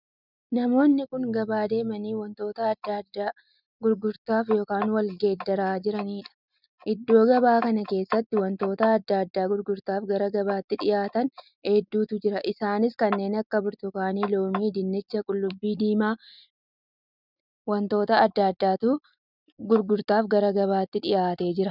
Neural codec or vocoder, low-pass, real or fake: none; 5.4 kHz; real